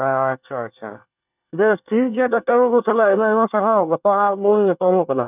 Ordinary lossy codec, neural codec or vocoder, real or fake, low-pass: none; codec, 24 kHz, 1 kbps, SNAC; fake; 3.6 kHz